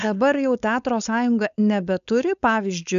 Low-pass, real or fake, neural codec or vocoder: 7.2 kHz; fake; codec, 16 kHz, 4.8 kbps, FACodec